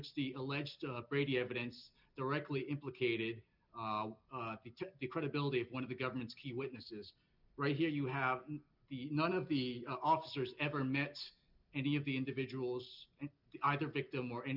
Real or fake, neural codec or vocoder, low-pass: real; none; 5.4 kHz